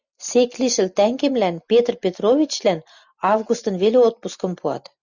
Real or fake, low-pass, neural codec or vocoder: real; 7.2 kHz; none